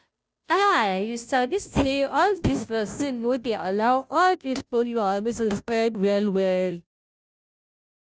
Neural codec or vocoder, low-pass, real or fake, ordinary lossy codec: codec, 16 kHz, 0.5 kbps, FunCodec, trained on Chinese and English, 25 frames a second; none; fake; none